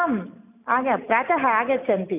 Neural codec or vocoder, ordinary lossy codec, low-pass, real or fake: none; none; 3.6 kHz; real